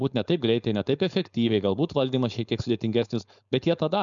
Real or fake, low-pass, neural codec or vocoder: fake; 7.2 kHz; codec, 16 kHz, 4.8 kbps, FACodec